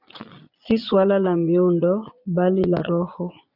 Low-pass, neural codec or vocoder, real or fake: 5.4 kHz; vocoder, 22.05 kHz, 80 mel bands, Vocos; fake